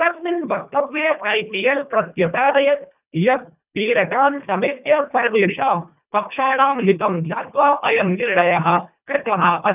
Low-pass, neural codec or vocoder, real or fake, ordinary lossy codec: 3.6 kHz; codec, 24 kHz, 1.5 kbps, HILCodec; fake; none